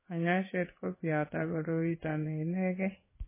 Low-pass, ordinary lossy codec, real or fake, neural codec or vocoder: 3.6 kHz; MP3, 16 kbps; fake; codec, 16 kHz in and 24 kHz out, 1 kbps, XY-Tokenizer